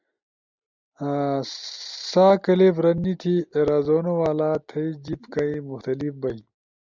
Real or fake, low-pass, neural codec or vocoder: real; 7.2 kHz; none